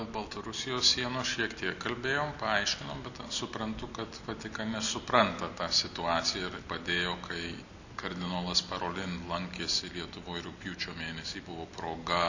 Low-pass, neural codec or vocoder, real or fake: 7.2 kHz; none; real